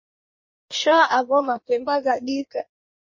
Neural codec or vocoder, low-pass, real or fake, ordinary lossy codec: codec, 16 kHz in and 24 kHz out, 1.1 kbps, FireRedTTS-2 codec; 7.2 kHz; fake; MP3, 32 kbps